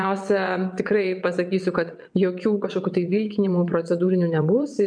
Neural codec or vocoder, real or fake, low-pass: vocoder, 22.05 kHz, 80 mel bands, WaveNeXt; fake; 9.9 kHz